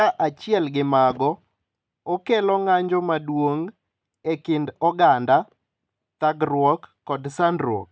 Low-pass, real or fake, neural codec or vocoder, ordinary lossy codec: none; real; none; none